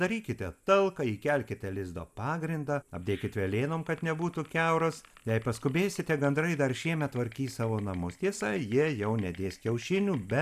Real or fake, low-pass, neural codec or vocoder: real; 14.4 kHz; none